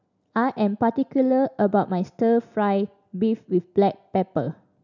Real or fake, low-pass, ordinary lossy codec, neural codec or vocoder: real; 7.2 kHz; none; none